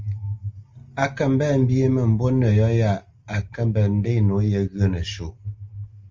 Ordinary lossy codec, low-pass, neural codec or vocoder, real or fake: Opus, 32 kbps; 7.2 kHz; none; real